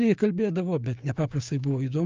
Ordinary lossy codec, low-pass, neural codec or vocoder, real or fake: Opus, 24 kbps; 7.2 kHz; codec, 16 kHz, 6 kbps, DAC; fake